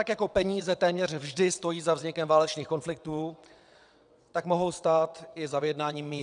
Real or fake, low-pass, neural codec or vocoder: fake; 9.9 kHz; vocoder, 22.05 kHz, 80 mel bands, WaveNeXt